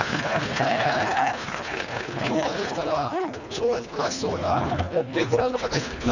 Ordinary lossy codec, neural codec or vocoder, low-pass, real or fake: none; codec, 24 kHz, 1.5 kbps, HILCodec; 7.2 kHz; fake